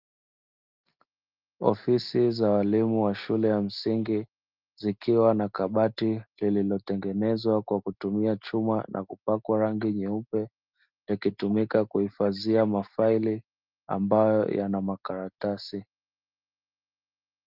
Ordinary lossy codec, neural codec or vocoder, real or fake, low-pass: Opus, 32 kbps; none; real; 5.4 kHz